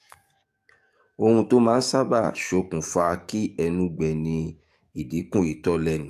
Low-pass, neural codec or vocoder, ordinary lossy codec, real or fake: 14.4 kHz; codec, 44.1 kHz, 7.8 kbps, DAC; AAC, 96 kbps; fake